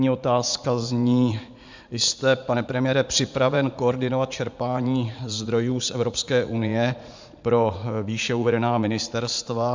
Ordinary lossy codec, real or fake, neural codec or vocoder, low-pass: MP3, 64 kbps; fake; vocoder, 44.1 kHz, 80 mel bands, Vocos; 7.2 kHz